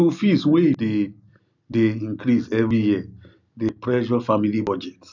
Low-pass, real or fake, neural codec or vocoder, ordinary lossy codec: 7.2 kHz; real; none; none